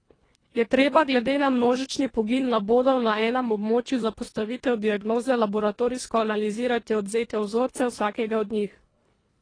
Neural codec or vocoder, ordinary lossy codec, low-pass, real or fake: codec, 24 kHz, 1.5 kbps, HILCodec; AAC, 32 kbps; 9.9 kHz; fake